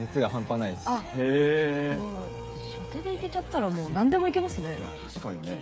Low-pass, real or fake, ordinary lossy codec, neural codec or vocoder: none; fake; none; codec, 16 kHz, 8 kbps, FreqCodec, smaller model